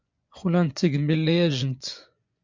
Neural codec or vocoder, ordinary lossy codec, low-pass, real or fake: vocoder, 24 kHz, 100 mel bands, Vocos; MP3, 64 kbps; 7.2 kHz; fake